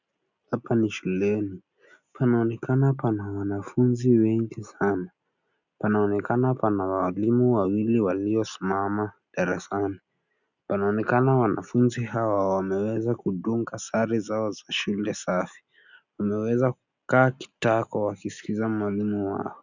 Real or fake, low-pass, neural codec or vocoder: real; 7.2 kHz; none